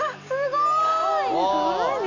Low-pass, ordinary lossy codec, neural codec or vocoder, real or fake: 7.2 kHz; none; none; real